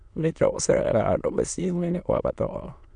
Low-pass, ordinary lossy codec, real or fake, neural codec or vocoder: 9.9 kHz; none; fake; autoencoder, 22.05 kHz, a latent of 192 numbers a frame, VITS, trained on many speakers